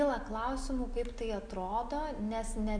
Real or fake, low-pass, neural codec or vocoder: real; 9.9 kHz; none